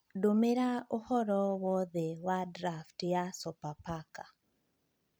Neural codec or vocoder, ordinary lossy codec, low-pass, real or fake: vocoder, 44.1 kHz, 128 mel bands every 256 samples, BigVGAN v2; none; none; fake